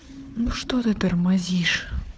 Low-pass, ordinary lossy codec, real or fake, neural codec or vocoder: none; none; fake; codec, 16 kHz, 4 kbps, FunCodec, trained on Chinese and English, 50 frames a second